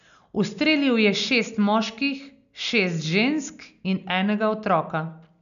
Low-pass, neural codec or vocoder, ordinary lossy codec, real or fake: 7.2 kHz; none; none; real